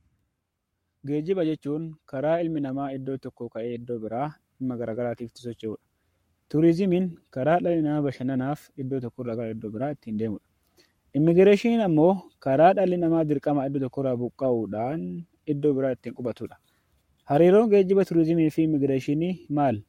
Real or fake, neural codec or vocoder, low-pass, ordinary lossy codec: fake; codec, 44.1 kHz, 7.8 kbps, Pupu-Codec; 19.8 kHz; MP3, 64 kbps